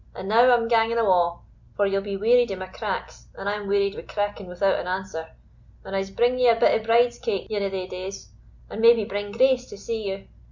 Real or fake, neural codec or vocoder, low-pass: real; none; 7.2 kHz